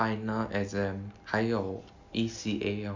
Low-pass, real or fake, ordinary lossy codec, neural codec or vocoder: 7.2 kHz; real; MP3, 64 kbps; none